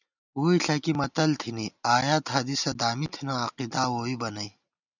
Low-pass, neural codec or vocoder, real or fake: 7.2 kHz; none; real